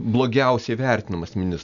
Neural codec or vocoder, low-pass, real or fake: none; 7.2 kHz; real